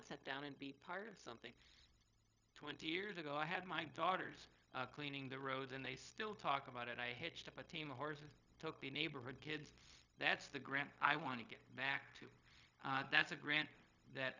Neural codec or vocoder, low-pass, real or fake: codec, 16 kHz, 0.4 kbps, LongCat-Audio-Codec; 7.2 kHz; fake